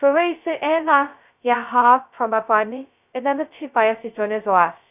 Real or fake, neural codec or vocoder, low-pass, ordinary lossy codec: fake; codec, 16 kHz, 0.2 kbps, FocalCodec; 3.6 kHz; none